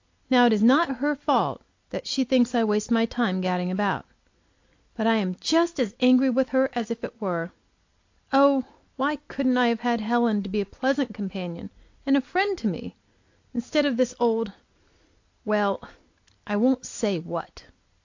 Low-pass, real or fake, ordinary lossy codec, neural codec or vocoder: 7.2 kHz; real; AAC, 48 kbps; none